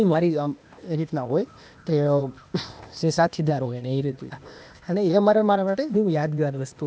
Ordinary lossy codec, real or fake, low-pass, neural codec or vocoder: none; fake; none; codec, 16 kHz, 0.8 kbps, ZipCodec